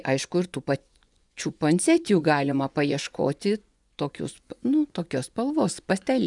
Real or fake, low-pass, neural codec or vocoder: fake; 10.8 kHz; vocoder, 24 kHz, 100 mel bands, Vocos